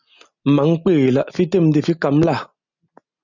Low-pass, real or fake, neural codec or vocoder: 7.2 kHz; real; none